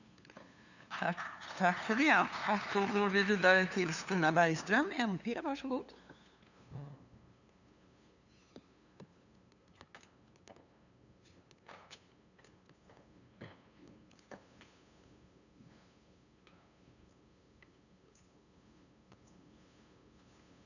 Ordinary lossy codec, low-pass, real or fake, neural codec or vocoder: none; 7.2 kHz; fake; codec, 16 kHz, 2 kbps, FunCodec, trained on LibriTTS, 25 frames a second